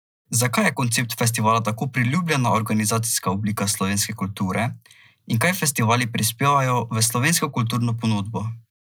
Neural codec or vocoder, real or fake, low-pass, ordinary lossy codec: none; real; none; none